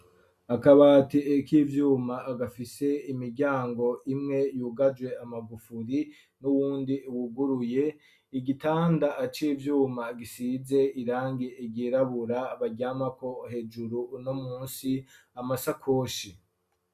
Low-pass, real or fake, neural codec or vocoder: 14.4 kHz; real; none